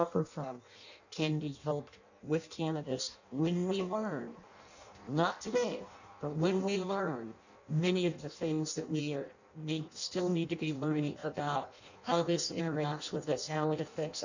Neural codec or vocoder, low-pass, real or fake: codec, 16 kHz in and 24 kHz out, 0.6 kbps, FireRedTTS-2 codec; 7.2 kHz; fake